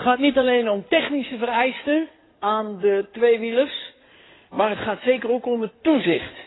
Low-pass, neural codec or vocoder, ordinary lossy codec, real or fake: 7.2 kHz; codec, 16 kHz in and 24 kHz out, 2.2 kbps, FireRedTTS-2 codec; AAC, 16 kbps; fake